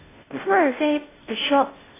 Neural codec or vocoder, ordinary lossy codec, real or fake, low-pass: codec, 16 kHz, 0.5 kbps, FunCodec, trained on Chinese and English, 25 frames a second; AAC, 16 kbps; fake; 3.6 kHz